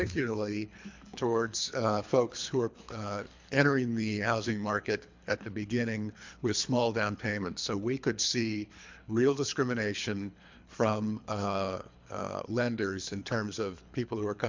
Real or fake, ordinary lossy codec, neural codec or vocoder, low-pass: fake; MP3, 64 kbps; codec, 24 kHz, 3 kbps, HILCodec; 7.2 kHz